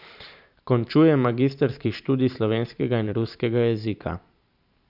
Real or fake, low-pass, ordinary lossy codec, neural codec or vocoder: fake; 5.4 kHz; none; vocoder, 44.1 kHz, 128 mel bands every 512 samples, BigVGAN v2